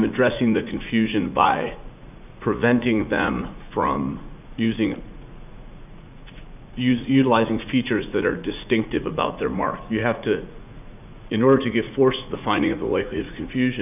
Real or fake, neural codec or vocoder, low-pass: fake; vocoder, 44.1 kHz, 80 mel bands, Vocos; 3.6 kHz